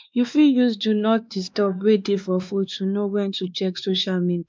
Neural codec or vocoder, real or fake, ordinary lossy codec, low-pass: codec, 16 kHz, 2 kbps, X-Codec, WavLM features, trained on Multilingual LibriSpeech; fake; none; none